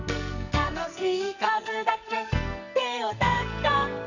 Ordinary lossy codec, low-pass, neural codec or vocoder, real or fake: none; 7.2 kHz; codec, 44.1 kHz, 2.6 kbps, SNAC; fake